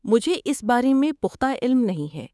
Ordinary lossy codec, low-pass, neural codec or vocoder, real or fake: none; none; codec, 24 kHz, 3.1 kbps, DualCodec; fake